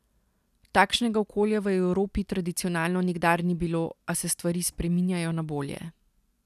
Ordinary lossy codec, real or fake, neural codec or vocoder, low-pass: none; real; none; 14.4 kHz